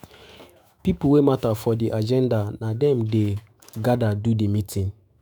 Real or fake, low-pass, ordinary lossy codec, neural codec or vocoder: fake; none; none; autoencoder, 48 kHz, 128 numbers a frame, DAC-VAE, trained on Japanese speech